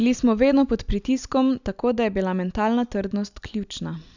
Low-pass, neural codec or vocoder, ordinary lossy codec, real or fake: 7.2 kHz; none; none; real